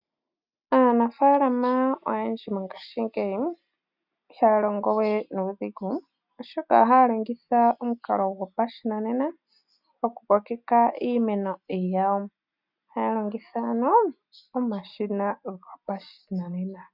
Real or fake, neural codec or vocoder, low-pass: real; none; 5.4 kHz